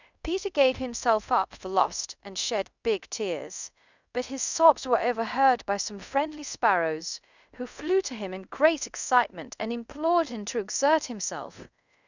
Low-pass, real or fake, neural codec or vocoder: 7.2 kHz; fake; codec, 24 kHz, 0.5 kbps, DualCodec